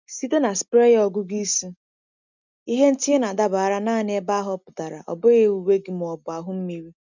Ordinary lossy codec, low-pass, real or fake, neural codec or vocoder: none; 7.2 kHz; real; none